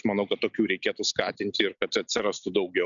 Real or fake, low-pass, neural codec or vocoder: real; 7.2 kHz; none